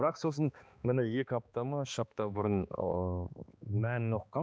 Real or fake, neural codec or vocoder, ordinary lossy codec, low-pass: fake; codec, 16 kHz, 2 kbps, X-Codec, HuBERT features, trained on balanced general audio; none; none